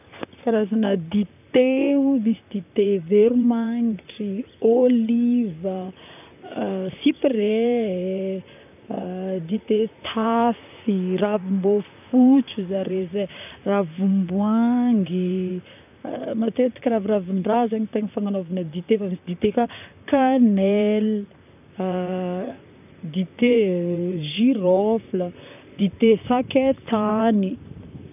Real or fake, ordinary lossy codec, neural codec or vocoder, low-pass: fake; none; vocoder, 44.1 kHz, 128 mel bands, Pupu-Vocoder; 3.6 kHz